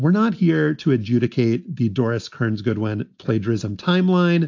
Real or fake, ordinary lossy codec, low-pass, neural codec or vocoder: real; AAC, 48 kbps; 7.2 kHz; none